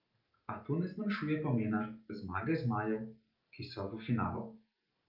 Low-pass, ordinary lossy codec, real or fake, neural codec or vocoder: 5.4 kHz; Opus, 24 kbps; real; none